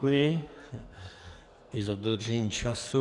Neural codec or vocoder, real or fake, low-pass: codec, 44.1 kHz, 2.6 kbps, SNAC; fake; 10.8 kHz